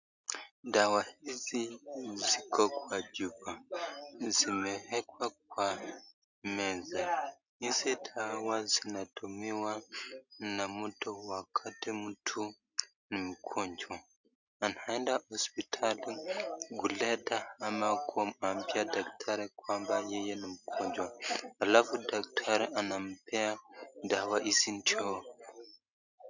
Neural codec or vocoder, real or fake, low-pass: none; real; 7.2 kHz